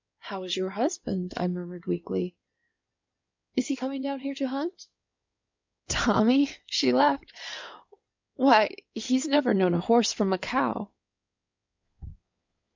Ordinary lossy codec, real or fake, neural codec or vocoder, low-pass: MP3, 64 kbps; fake; codec, 16 kHz in and 24 kHz out, 2.2 kbps, FireRedTTS-2 codec; 7.2 kHz